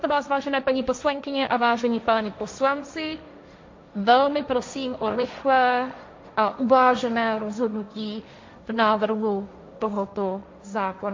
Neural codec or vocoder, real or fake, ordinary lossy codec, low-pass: codec, 16 kHz, 1.1 kbps, Voila-Tokenizer; fake; MP3, 48 kbps; 7.2 kHz